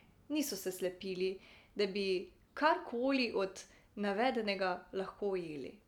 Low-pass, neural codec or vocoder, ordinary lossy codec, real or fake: 19.8 kHz; none; none; real